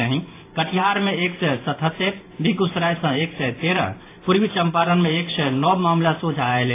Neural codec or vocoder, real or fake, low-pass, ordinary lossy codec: vocoder, 44.1 kHz, 128 mel bands every 512 samples, BigVGAN v2; fake; 3.6 kHz; AAC, 24 kbps